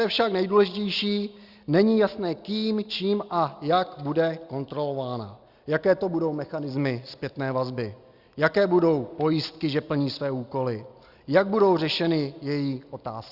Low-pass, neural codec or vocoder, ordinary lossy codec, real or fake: 5.4 kHz; none; Opus, 64 kbps; real